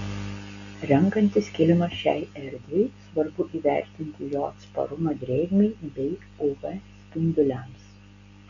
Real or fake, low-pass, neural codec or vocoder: real; 7.2 kHz; none